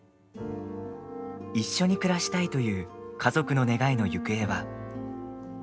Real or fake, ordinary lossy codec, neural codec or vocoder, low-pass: real; none; none; none